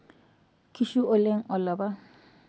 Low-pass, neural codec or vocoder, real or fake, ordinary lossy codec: none; none; real; none